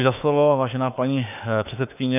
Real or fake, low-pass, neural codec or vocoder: fake; 3.6 kHz; autoencoder, 48 kHz, 32 numbers a frame, DAC-VAE, trained on Japanese speech